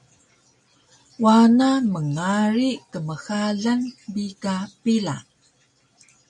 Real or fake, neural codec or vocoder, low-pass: real; none; 10.8 kHz